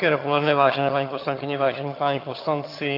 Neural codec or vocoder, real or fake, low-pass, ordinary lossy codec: vocoder, 22.05 kHz, 80 mel bands, HiFi-GAN; fake; 5.4 kHz; AAC, 32 kbps